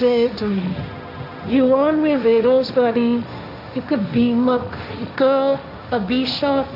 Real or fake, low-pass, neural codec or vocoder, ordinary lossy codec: fake; 5.4 kHz; codec, 16 kHz, 1.1 kbps, Voila-Tokenizer; none